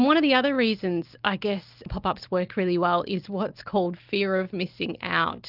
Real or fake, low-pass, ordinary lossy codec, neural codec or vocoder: real; 5.4 kHz; Opus, 32 kbps; none